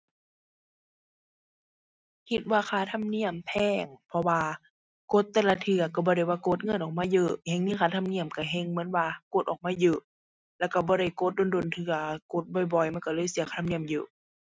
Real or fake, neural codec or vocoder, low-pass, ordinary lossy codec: real; none; none; none